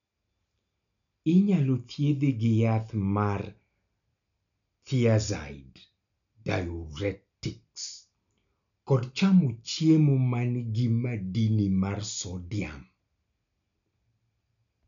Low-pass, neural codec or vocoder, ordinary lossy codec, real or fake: 7.2 kHz; none; none; real